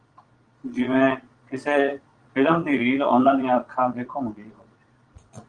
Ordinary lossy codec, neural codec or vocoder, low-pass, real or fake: Opus, 24 kbps; vocoder, 22.05 kHz, 80 mel bands, Vocos; 9.9 kHz; fake